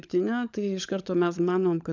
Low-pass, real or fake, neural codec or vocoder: 7.2 kHz; fake; codec, 16 kHz, 16 kbps, FunCodec, trained on LibriTTS, 50 frames a second